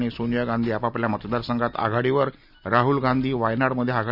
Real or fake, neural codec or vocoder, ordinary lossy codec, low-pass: real; none; none; 5.4 kHz